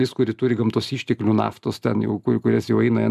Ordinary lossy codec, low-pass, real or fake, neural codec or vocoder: MP3, 96 kbps; 14.4 kHz; real; none